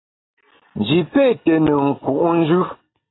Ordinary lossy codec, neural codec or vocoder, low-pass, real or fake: AAC, 16 kbps; none; 7.2 kHz; real